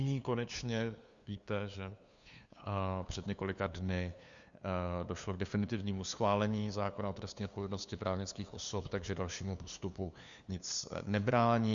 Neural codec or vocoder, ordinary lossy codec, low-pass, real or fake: codec, 16 kHz, 2 kbps, FunCodec, trained on LibriTTS, 25 frames a second; Opus, 64 kbps; 7.2 kHz; fake